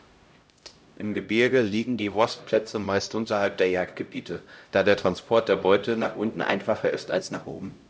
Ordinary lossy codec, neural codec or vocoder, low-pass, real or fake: none; codec, 16 kHz, 0.5 kbps, X-Codec, HuBERT features, trained on LibriSpeech; none; fake